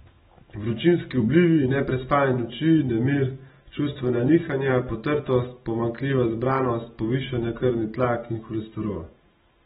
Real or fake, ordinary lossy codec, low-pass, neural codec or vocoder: real; AAC, 16 kbps; 7.2 kHz; none